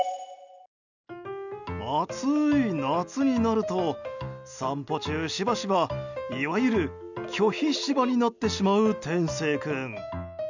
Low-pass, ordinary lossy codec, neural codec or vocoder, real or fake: 7.2 kHz; none; none; real